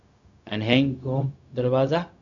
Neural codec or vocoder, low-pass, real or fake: codec, 16 kHz, 0.4 kbps, LongCat-Audio-Codec; 7.2 kHz; fake